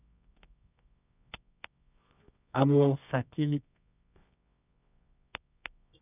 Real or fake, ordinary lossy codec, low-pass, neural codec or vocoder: fake; none; 3.6 kHz; codec, 24 kHz, 0.9 kbps, WavTokenizer, medium music audio release